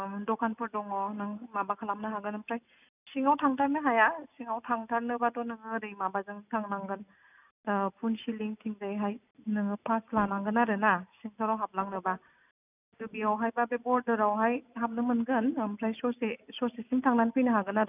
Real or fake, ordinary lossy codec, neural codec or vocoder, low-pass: real; none; none; 3.6 kHz